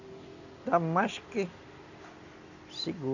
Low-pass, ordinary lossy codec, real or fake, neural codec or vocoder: 7.2 kHz; none; real; none